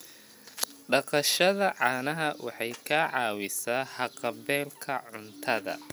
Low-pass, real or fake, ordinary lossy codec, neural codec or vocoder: none; real; none; none